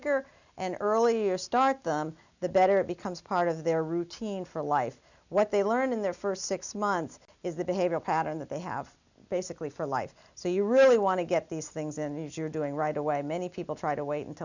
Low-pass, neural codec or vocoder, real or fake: 7.2 kHz; none; real